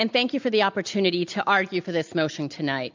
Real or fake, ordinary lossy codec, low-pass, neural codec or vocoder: real; MP3, 64 kbps; 7.2 kHz; none